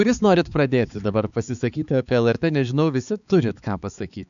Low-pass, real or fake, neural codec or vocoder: 7.2 kHz; fake; codec, 16 kHz, 4 kbps, X-Codec, HuBERT features, trained on balanced general audio